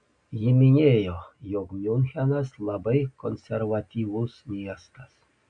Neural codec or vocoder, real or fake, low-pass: none; real; 9.9 kHz